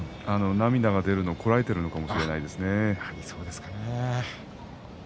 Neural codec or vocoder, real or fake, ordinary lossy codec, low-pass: none; real; none; none